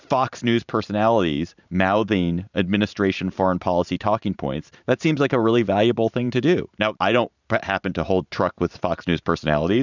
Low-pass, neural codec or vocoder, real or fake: 7.2 kHz; vocoder, 44.1 kHz, 128 mel bands every 512 samples, BigVGAN v2; fake